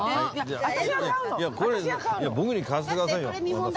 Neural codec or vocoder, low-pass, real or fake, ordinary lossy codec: none; none; real; none